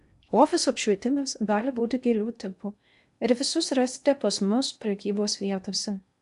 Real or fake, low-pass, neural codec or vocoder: fake; 10.8 kHz; codec, 16 kHz in and 24 kHz out, 0.6 kbps, FocalCodec, streaming, 2048 codes